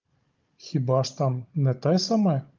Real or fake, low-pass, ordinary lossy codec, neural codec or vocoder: fake; 7.2 kHz; Opus, 32 kbps; codec, 16 kHz, 16 kbps, FunCodec, trained on Chinese and English, 50 frames a second